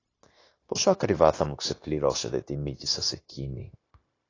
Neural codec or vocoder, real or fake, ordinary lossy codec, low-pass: codec, 16 kHz, 0.9 kbps, LongCat-Audio-Codec; fake; AAC, 32 kbps; 7.2 kHz